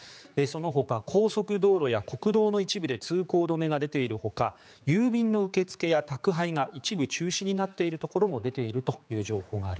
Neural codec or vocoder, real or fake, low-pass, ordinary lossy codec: codec, 16 kHz, 4 kbps, X-Codec, HuBERT features, trained on general audio; fake; none; none